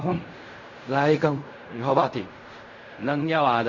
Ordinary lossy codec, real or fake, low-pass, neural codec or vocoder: MP3, 32 kbps; fake; 7.2 kHz; codec, 16 kHz in and 24 kHz out, 0.4 kbps, LongCat-Audio-Codec, fine tuned four codebook decoder